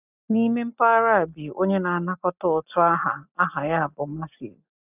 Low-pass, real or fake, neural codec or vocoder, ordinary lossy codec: 3.6 kHz; real; none; none